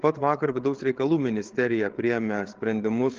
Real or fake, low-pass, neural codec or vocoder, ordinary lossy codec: fake; 7.2 kHz; codec, 16 kHz, 8 kbps, FreqCodec, larger model; Opus, 16 kbps